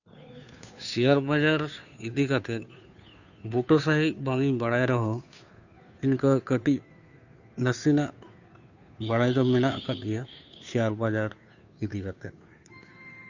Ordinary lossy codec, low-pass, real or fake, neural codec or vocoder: none; 7.2 kHz; fake; codec, 16 kHz, 2 kbps, FunCodec, trained on Chinese and English, 25 frames a second